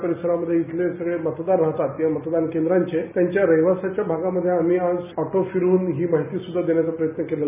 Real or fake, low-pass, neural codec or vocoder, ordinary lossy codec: real; 3.6 kHz; none; none